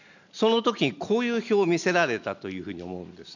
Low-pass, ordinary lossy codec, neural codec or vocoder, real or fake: 7.2 kHz; none; none; real